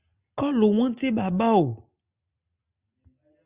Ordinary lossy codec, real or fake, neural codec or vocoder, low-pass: Opus, 64 kbps; real; none; 3.6 kHz